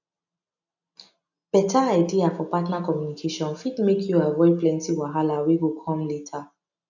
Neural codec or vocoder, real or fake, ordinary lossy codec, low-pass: none; real; none; 7.2 kHz